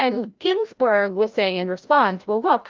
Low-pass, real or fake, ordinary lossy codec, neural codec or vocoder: 7.2 kHz; fake; Opus, 24 kbps; codec, 16 kHz, 0.5 kbps, FreqCodec, larger model